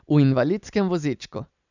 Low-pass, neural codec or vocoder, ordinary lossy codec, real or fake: 7.2 kHz; none; none; real